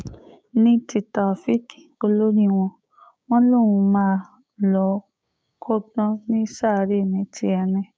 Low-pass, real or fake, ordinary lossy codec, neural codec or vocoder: none; fake; none; codec, 16 kHz, 6 kbps, DAC